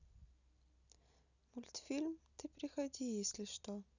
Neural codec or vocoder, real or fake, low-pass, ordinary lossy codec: none; real; 7.2 kHz; none